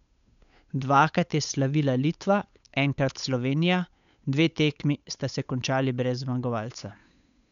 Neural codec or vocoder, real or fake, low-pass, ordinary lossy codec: codec, 16 kHz, 8 kbps, FunCodec, trained on Chinese and English, 25 frames a second; fake; 7.2 kHz; MP3, 96 kbps